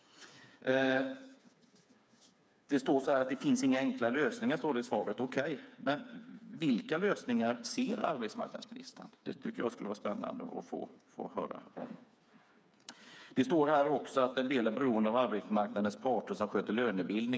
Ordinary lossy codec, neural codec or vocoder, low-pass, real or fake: none; codec, 16 kHz, 4 kbps, FreqCodec, smaller model; none; fake